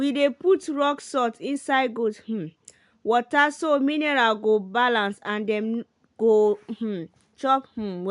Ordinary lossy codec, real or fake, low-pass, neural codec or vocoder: none; real; 10.8 kHz; none